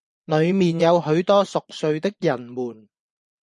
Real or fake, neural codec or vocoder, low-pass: fake; vocoder, 24 kHz, 100 mel bands, Vocos; 10.8 kHz